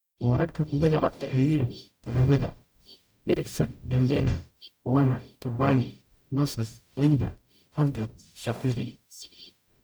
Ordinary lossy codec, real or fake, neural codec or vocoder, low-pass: none; fake; codec, 44.1 kHz, 0.9 kbps, DAC; none